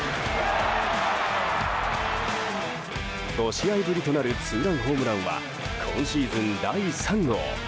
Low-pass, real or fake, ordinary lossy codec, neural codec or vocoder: none; real; none; none